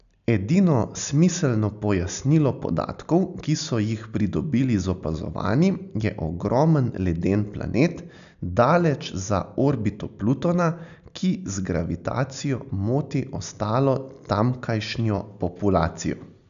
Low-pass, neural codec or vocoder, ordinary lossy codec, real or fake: 7.2 kHz; none; none; real